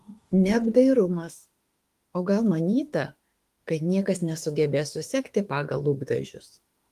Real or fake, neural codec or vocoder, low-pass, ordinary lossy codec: fake; autoencoder, 48 kHz, 32 numbers a frame, DAC-VAE, trained on Japanese speech; 14.4 kHz; Opus, 24 kbps